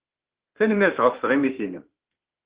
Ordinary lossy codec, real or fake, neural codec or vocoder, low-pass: Opus, 16 kbps; fake; autoencoder, 48 kHz, 32 numbers a frame, DAC-VAE, trained on Japanese speech; 3.6 kHz